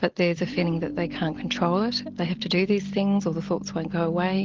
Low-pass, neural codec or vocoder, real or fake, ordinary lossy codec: 7.2 kHz; none; real; Opus, 16 kbps